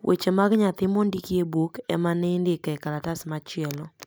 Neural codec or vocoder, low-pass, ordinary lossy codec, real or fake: none; none; none; real